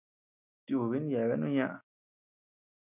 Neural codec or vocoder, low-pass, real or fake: none; 3.6 kHz; real